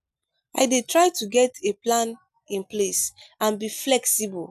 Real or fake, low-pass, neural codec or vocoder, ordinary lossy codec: real; 14.4 kHz; none; none